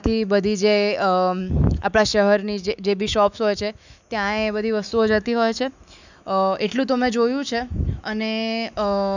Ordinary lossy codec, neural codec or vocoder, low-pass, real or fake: none; autoencoder, 48 kHz, 128 numbers a frame, DAC-VAE, trained on Japanese speech; 7.2 kHz; fake